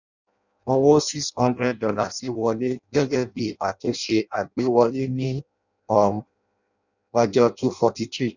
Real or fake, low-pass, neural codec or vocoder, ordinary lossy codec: fake; 7.2 kHz; codec, 16 kHz in and 24 kHz out, 0.6 kbps, FireRedTTS-2 codec; none